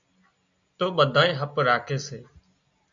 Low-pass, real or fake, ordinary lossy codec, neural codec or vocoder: 7.2 kHz; real; AAC, 64 kbps; none